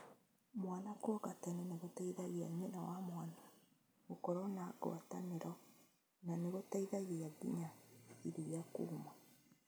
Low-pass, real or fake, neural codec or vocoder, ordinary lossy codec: none; real; none; none